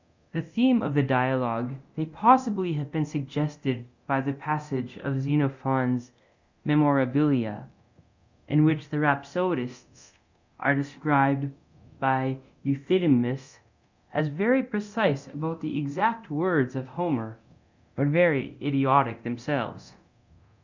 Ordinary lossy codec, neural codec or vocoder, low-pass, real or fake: Opus, 64 kbps; codec, 24 kHz, 0.9 kbps, DualCodec; 7.2 kHz; fake